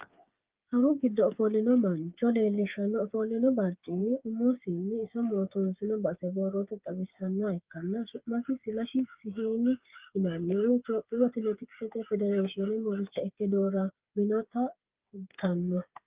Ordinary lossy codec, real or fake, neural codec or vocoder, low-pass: Opus, 32 kbps; fake; codec, 16 kHz, 8 kbps, FreqCodec, smaller model; 3.6 kHz